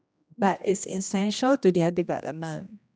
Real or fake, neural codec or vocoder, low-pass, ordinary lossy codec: fake; codec, 16 kHz, 1 kbps, X-Codec, HuBERT features, trained on general audio; none; none